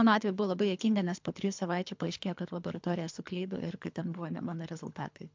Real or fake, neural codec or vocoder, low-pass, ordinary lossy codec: fake; codec, 24 kHz, 3 kbps, HILCodec; 7.2 kHz; MP3, 64 kbps